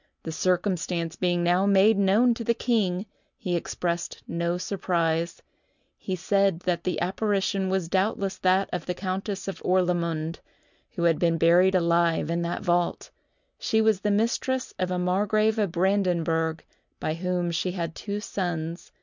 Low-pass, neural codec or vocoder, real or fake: 7.2 kHz; none; real